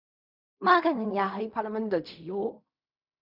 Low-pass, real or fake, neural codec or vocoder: 5.4 kHz; fake; codec, 16 kHz in and 24 kHz out, 0.4 kbps, LongCat-Audio-Codec, fine tuned four codebook decoder